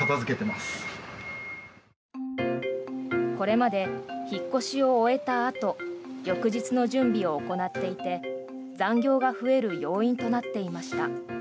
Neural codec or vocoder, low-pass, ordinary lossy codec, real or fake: none; none; none; real